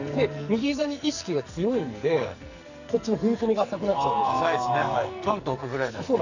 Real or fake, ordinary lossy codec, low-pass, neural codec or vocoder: fake; none; 7.2 kHz; codec, 44.1 kHz, 2.6 kbps, SNAC